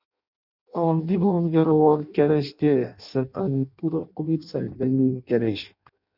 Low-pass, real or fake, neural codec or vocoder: 5.4 kHz; fake; codec, 16 kHz in and 24 kHz out, 0.6 kbps, FireRedTTS-2 codec